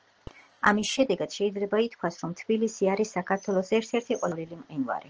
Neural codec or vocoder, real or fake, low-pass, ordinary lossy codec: none; real; 7.2 kHz; Opus, 16 kbps